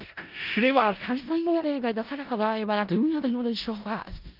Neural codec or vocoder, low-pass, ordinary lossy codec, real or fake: codec, 16 kHz in and 24 kHz out, 0.4 kbps, LongCat-Audio-Codec, four codebook decoder; 5.4 kHz; Opus, 32 kbps; fake